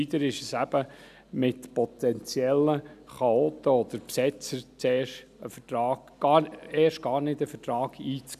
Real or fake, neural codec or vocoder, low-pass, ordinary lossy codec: real; none; 14.4 kHz; none